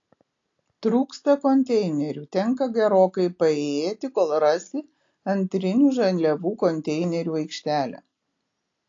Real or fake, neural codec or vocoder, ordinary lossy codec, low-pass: real; none; AAC, 48 kbps; 7.2 kHz